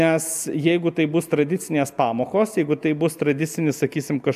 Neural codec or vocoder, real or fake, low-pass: none; real; 14.4 kHz